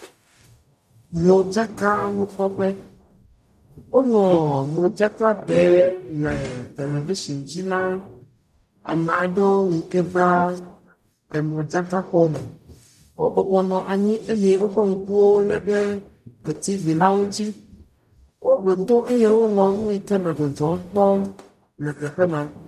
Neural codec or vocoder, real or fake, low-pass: codec, 44.1 kHz, 0.9 kbps, DAC; fake; 14.4 kHz